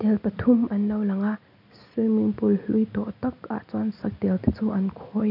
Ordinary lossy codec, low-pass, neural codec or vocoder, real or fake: none; 5.4 kHz; none; real